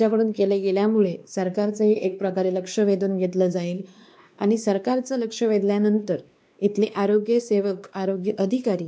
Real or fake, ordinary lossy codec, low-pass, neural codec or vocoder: fake; none; none; codec, 16 kHz, 2 kbps, X-Codec, WavLM features, trained on Multilingual LibriSpeech